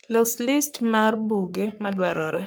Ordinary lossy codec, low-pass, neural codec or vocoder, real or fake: none; none; codec, 44.1 kHz, 3.4 kbps, Pupu-Codec; fake